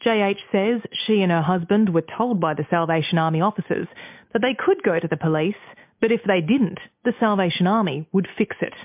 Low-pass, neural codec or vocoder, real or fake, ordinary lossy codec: 3.6 kHz; none; real; MP3, 32 kbps